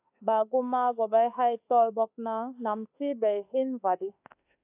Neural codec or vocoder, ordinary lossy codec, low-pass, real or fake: autoencoder, 48 kHz, 32 numbers a frame, DAC-VAE, trained on Japanese speech; AAC, 32 kbps; 3.6 kHz; fake